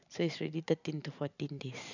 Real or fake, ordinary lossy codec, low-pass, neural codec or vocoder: fake; none; 7.2 kHz; vocoder, 44.1 kHz, 128 mel bands every 512 samples, BigVGAN v2